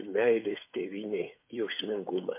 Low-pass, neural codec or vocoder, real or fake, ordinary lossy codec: 3.6 kHz; codec, 16 kHz, 8 kbps, FreqCodec, smaller model; fake; MP3, 24 kbps